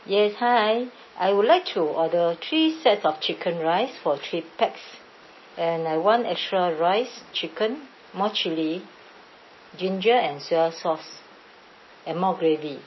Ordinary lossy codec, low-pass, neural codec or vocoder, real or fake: MP3, 24 kbps; 7.2 kHz; none; real